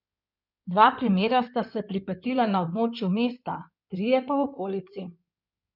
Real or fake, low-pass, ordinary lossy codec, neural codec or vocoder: fake; 5.4 kHz; none; codec, 16 kHz in and 24 kHz out, 2.2 kbps, FireRedTTS-2 codec